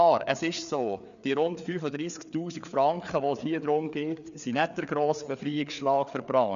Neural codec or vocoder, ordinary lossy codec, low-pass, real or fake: codec, 16 kHz, 4 kbps, FreqCodec, larger model; none; 7.2 kHz; fake